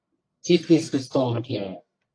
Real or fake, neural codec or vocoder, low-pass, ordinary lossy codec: fake; codec, 44.1 kHz, 1.7 kbps, Pupu-Codec; 9.9 kHz; AAC, 48 kbps